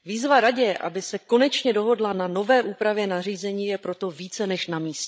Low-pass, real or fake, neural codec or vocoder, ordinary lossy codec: none; fake; codec, 16 kHz, 16 kbps, FreqCodec, larger model; none